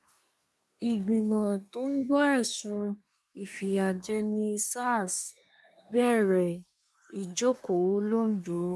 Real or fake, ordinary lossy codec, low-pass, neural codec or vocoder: fake; none; none; codec, 24 kHz, 1 kbps, SNAC